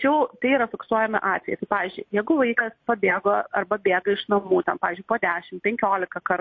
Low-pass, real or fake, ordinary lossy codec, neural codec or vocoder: 7.2 kHz; real; MP3, 32 kbps; none